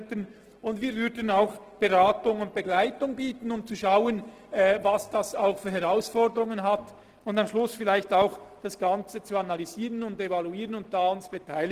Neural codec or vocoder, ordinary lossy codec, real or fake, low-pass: none; Opus, 16 kbps; real; 14.4 kHz